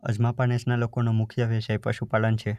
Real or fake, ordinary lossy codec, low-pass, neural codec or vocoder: real; none; 14.4 kHz; none